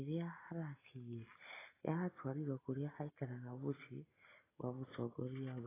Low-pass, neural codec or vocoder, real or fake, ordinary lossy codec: 3.6 kHz; none; real; AAC, 16 kbps